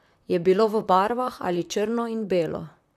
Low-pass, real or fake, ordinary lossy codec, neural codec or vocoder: 14.4 kHz; fake; none; vocoder, 44.1 kHz, 128 mel bands, Pupu-Vocoder